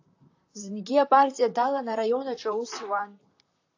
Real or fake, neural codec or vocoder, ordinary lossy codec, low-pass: fake; vocoder, 44.1 kHz, 128 mel bands, Pupu-Vocoder; AAC, 48 kbps; 7.2 kHz